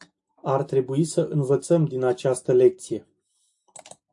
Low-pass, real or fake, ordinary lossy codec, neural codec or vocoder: 10.8 kHz; real; AAC, 64 kbps; none